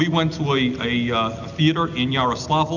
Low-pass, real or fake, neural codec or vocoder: 7.2 kHz; real; none